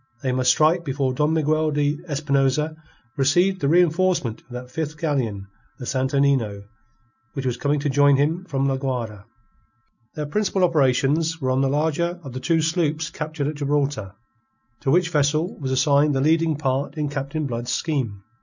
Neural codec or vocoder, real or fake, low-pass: none; real; 7.2 kHz